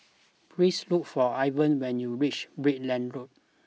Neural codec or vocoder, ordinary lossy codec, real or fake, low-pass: none; none; real; none